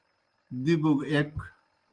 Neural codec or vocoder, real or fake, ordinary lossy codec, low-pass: none; real; Opus, 24 kbps; 9.9 kHz